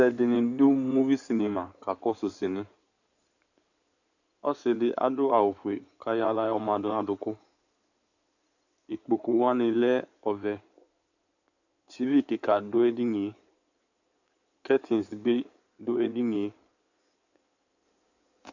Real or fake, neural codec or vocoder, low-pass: fake; codec, 16 kHz in and 24 kHz out, 2.2 kbps, FireRedTTS-2 codec; 7.2 kHz